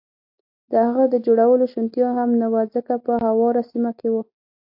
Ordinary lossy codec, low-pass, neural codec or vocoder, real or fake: AAC, 48 kbps; 5.4 kHz; none; real